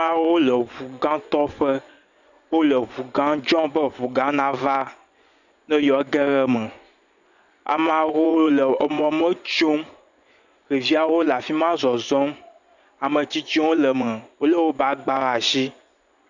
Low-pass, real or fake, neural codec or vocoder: 7.2 kHz; fake; vocoder, 24 kHz, 100 mel bands, Vocos